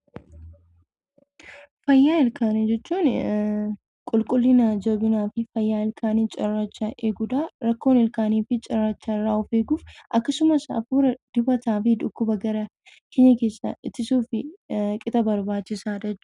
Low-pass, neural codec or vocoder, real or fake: 10.8 kHz; none; real